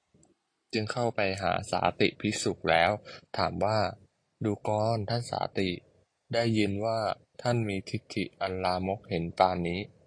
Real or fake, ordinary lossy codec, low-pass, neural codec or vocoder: real; AAC, 48 kbps; 9.9 kHz; none